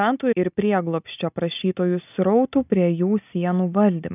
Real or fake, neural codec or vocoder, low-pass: real; none; 3.6 kHz